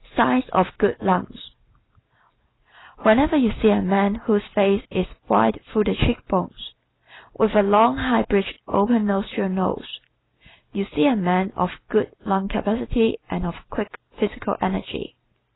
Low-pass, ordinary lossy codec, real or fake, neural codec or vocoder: 7.2 kHz; AAC, 16 kbps; real; none